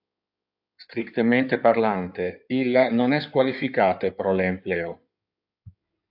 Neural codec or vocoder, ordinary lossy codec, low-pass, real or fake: codec, 16 kHz in and 24 kHz out, 2.2 kbps, FireRedTTS-2 codec; AAC, 48 kbps; 5.4 kHz; fake